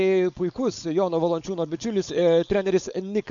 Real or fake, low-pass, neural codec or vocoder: fake; 7.2 kHz; codec, 16 kHz, 4.8 kbps, FACodec